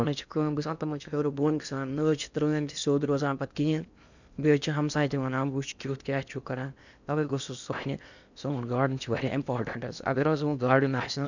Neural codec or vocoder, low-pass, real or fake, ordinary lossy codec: codec, 16 kHz in and 24 kHz out, 0.8 kbps, FocalCodec, streaming, 65536 codes; 7.2 kHz; fake; none